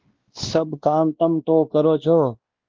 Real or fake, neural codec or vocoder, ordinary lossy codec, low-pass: fake; codec, 16 kHz, 1 kbps, X-Codec, WavLM features, trained on Multilingual LibriSpeech; Opus, 16 kbps; 7.2 kHz